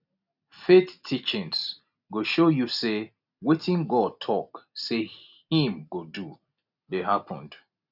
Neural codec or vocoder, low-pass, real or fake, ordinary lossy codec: none; 5.4 kHz; real; none